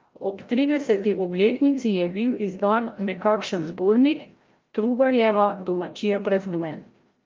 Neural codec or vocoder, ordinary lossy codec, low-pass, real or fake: codec, 16 kHz, 0.5 kbps, FreqCodec, larger model; Opus, 24 kbps; 7.2 kHz; fake